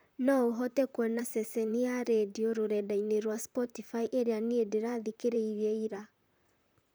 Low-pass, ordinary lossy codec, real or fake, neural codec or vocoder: none; none; fake; vocoder, 44.1 kHz, 128 mel bands, Pupu-Vocoder